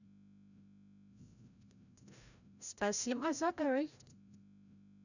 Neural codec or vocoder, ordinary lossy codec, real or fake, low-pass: codec, 16 kHz, 0.5 kbps, FreqCodec, larger model; none; fake; 7.2 kHz